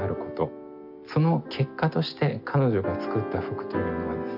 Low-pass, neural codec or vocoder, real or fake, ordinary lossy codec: 5.4 kHz; none; real; none